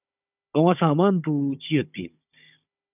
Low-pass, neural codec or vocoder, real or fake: 3.6 kHz; codec, 16 kHz, 16 kbps, FunCodec, trained on Chinese and English, 50 frames a second; fake